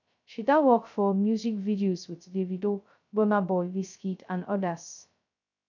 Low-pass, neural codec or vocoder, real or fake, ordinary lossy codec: 7.2 kHz; codec, 16 kHz, 0.2 kbps, FocalCodec; fake; none